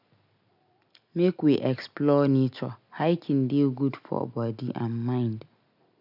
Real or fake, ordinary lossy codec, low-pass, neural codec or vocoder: real; none; 5.4 kHz; none